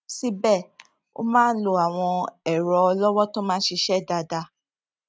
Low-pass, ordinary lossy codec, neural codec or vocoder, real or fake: none; none; none; real